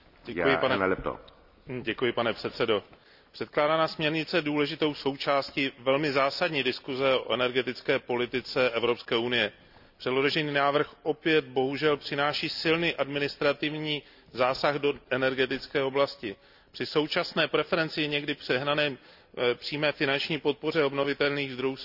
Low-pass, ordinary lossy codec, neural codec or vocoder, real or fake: 5.4 kHz; none; none; real